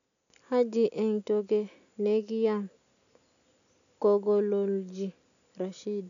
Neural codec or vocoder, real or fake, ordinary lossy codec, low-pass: none; real; none; 7.2 kHz